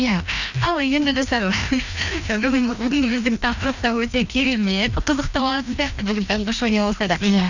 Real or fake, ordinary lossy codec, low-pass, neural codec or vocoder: fake; none; 7.2 kHz; codec, 16 kHz, 1 kbps, FreqCodec, larger model